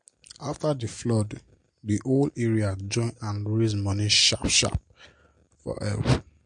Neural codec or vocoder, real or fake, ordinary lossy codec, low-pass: none; real; MP3, 48 kbps; 9.9 kHz